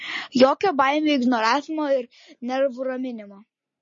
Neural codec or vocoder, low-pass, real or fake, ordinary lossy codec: none; 7.2 kHz; real; MP3, 32 kbps